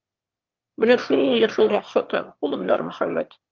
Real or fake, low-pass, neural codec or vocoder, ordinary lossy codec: fake; 7.2 kHz; autoencoder, 22.05 kHz, a latent of 192 numbers a frame, VITS, trained on one speaker; Opus, 32 kbps